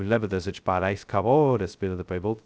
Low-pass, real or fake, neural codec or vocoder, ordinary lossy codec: none; fake; codec, 16 kHz, 0.2 kbps, FocalCodec; none